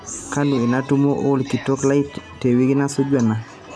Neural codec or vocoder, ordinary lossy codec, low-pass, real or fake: none; none; none; real